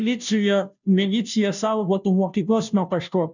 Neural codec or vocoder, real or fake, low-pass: codec, 16 kHz, 0.5 kbps, FunCodec, trained on Chinese and English, 25 frames a second; fake; 7.2 kHz